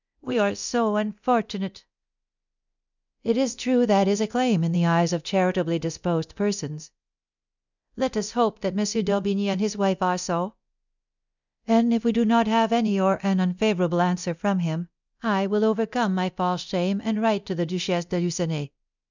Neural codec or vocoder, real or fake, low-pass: codec, 24 kHz, 0.9 kbps, DualCodec; fake; 7.2 kHz